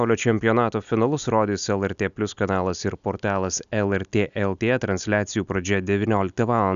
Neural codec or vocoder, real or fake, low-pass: none; real; 7.2 kHz